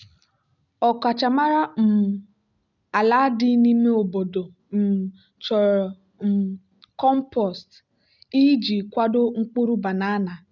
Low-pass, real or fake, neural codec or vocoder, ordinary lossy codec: 7.2 kHz; real; none; none